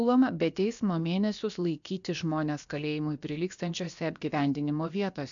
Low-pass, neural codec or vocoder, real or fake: 7.2 kHz; codec, 16 kHz, about 1 kbps, DyCAST, with the encoder's durations; fake